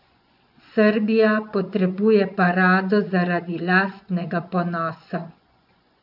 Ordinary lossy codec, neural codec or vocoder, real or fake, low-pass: AAC, 48 kbps; vocoder, 24 kHz, 100 mel bands, Vocos; fake; 5.4 kHz